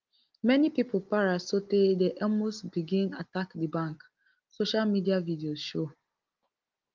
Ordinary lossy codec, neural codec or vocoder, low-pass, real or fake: Opus, 32 kbps; none; 7.2 kHz; real